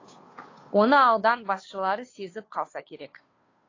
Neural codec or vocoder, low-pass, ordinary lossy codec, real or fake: codec, 16 kHz, 2 kbps, FunCodec, trained on Chinese and English, 25 frames a second; 7.2 kHz; AAC, 32 kbps; fake